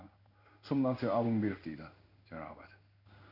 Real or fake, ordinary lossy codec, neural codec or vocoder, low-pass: fake; none; codec, 16 kHz in and 24 kHz out, 1 kbps, XY-Tokenizer; 5.4 kHz